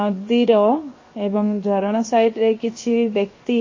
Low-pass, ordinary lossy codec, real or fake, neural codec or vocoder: 7.2 kHz; MP3, 32 kbps; fake; codec, 16 kHz, 0.7 kbps, FocalCodec